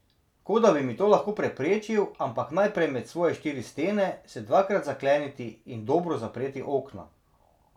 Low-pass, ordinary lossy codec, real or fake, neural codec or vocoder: 19.8 kHz; none; real; none